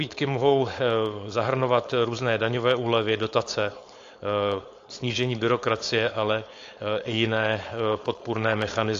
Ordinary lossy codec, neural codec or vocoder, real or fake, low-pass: AAC, 48 kbps; codec, 16 kHz, 4.8 kbps, FACodec; fake; 7.2 kHz